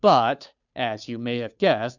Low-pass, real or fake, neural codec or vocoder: 7.2 kHz; fake; codec, 16 kHz, 2 kbps, FunCodec, trained on Chinese and English, 25 frames a second